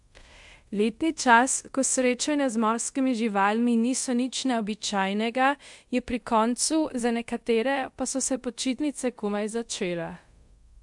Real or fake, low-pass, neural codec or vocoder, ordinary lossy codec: fake; 10.8 kHz; codec, 24 kHz, 0.5 kbps, DualCodec; MP3, 64 kbps